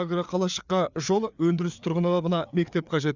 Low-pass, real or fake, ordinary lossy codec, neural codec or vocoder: 7.2 kHz; fake; none; codec, 16 kHz, 4 kbps, FreqCodec, larger model